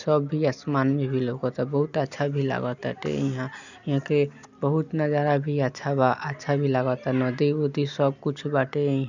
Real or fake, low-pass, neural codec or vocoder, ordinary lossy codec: real; 7.2 kHz; none; none